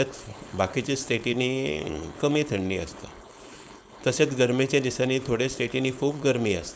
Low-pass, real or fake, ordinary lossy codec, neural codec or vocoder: none; fake; none; codec, 16 kHz, 4.8 kbps, FACodec